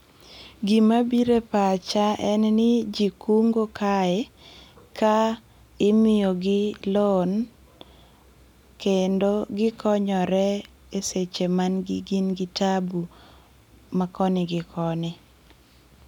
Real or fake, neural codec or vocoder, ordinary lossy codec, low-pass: real; none; none; 19.8 kHz